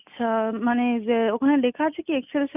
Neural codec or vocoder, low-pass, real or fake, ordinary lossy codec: none; 3.6 kHz; real; none